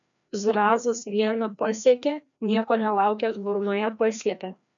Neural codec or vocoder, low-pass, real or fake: codec, 16 kHz, 1 kbps, FreqCodec, larger model; 7.2 kHz; fake